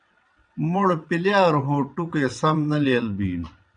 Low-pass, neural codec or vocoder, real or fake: 9.9 kHz; vocoder, 22.05 kHz, 80 mel bands, WaveNeXt; fake